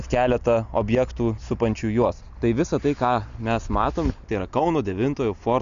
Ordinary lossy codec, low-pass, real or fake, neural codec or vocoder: Opus, 64 kbps; 7.2 kHz; real; none